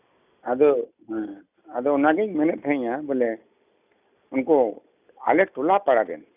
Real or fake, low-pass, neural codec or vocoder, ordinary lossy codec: real; 3.6 kHz; none; none